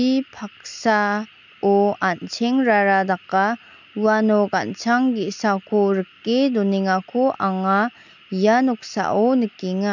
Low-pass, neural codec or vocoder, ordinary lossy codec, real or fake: 7.2 kHz; none; none; real